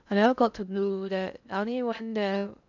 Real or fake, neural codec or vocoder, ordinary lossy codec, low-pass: fake; codec, 16 kHz in and 24 kHz out, 0.8 kbps, FocalCodec, streaming, 65536 codes; none; 7.2 kHz